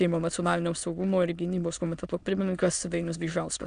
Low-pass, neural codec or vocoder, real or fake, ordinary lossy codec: 9.9 kHz; autoencoder, 22.05 kHz, a latent of 192 numbers a frame, VITS, trained on many speakers; fake; AAC, 96 kbps